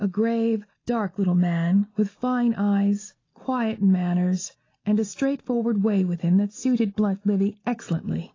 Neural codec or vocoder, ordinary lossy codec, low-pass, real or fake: none; AAC, 32 kbps; 7.2 kHz; real